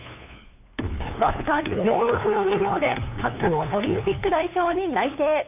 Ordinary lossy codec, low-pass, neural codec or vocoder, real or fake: none; 3.6 kHz; codec, 16 kHz, 2 kbps, FunCodec, trained on LibriTTS, 25 frames a second; fake